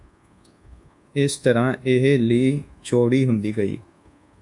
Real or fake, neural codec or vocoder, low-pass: fake; codec, 24 kHz, 1.2 kbps, DualCodec; 10.8 kHz